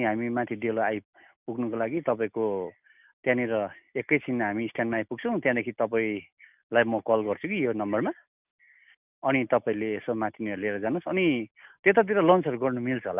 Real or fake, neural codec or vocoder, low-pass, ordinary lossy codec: real; none; 3.6 kHz; none